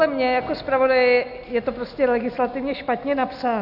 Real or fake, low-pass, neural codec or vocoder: real; 5.4 kHz; none